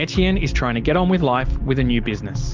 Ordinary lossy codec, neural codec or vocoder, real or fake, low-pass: Opus, 32 kbps; none; real; 7.2 kHz